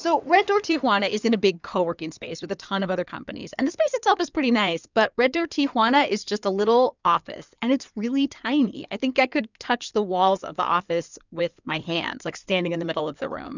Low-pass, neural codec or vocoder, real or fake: 7.2 kHz; codec, 16 kHz in and 24 kHz out, 2.2 kbps, FireRedTTS-2 codec; fake